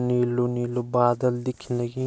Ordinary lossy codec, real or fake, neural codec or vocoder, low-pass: none; real; none; none